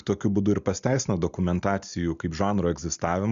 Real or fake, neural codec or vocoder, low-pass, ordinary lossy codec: real; none; 7.2 kHz; Opus, 64 kbps